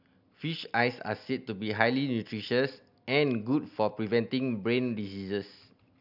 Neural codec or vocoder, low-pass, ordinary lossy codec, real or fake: none; 5.4 kHz; none; real